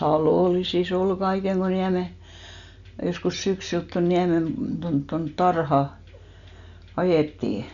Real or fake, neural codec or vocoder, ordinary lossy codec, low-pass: real; none; none; 7.2 kHz